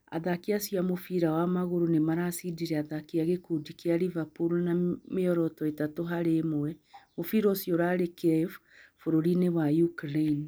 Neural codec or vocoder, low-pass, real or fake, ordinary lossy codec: none; none; real; none